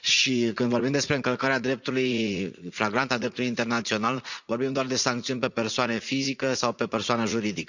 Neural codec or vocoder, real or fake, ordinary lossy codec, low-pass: vocoder, 44.1 kHz, 80 mel bands, Vocos; fake; none; 7.2 kHz